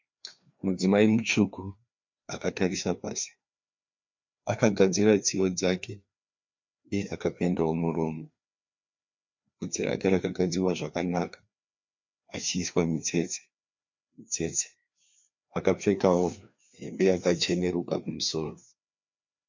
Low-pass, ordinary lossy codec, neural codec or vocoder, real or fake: 7.2 kHz; MP3, 64 kbps; codec, 16 kHz, 2 kbps, FreqCodec, larger model; fake